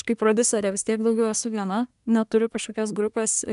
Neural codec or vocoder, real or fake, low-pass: codec, 24 kHz, 1 kbps, SNAC; fake; 10.8 kHz